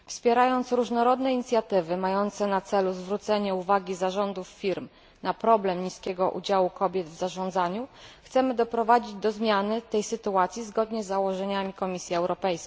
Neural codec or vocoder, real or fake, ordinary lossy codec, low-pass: none; real; none; none